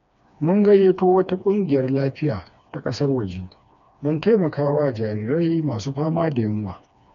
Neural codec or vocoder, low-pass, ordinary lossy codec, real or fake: codec, 16 kHz, 2 kbps, FreqCodec, smaller model; 7.2 kHz; none; fake